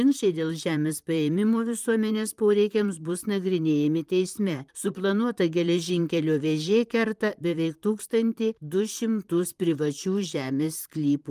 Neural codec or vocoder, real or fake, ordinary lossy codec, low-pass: vocoder, 44.1 kHz, 128 mel bands, Pupu-Vocoder; fake; Opus, 32 kbps; 14.4 kHz